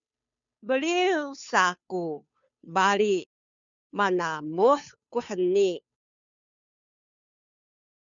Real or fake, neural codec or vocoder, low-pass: fake; codec, 16 kHz, 2 kbps, FunCodec, trained on Chinese and English, 25 frames a second; 7.2 kHz